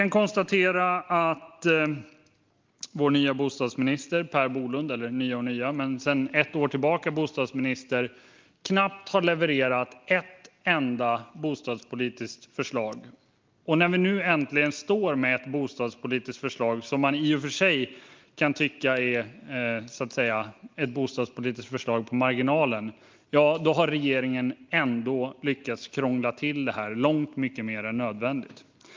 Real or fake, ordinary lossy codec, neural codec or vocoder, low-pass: real; Opus, 32 kbps; none; 7.2 kHz